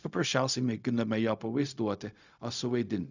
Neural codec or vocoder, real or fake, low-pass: codec, 16 kHz, 0.4 kbps, LongCat-Audio-Codec; fake; 7.2 kHz